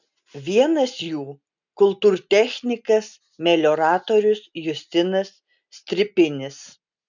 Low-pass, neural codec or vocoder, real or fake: 7.2 kHz; none; real